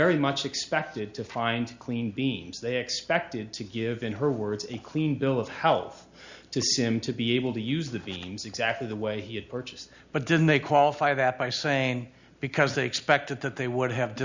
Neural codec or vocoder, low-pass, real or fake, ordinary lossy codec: none; 7.2 kHz; real; Opus, 64 kbps